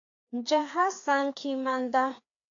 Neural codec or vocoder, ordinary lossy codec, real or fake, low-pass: codec, 16 kHz, 2 kbps, FreqCodec, larger model; AAC, 48 kbps; fake; 7.2 kHz